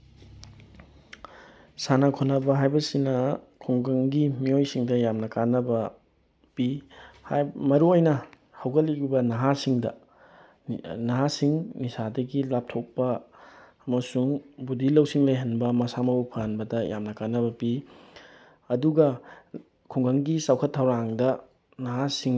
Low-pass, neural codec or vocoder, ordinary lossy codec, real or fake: none; none; none; real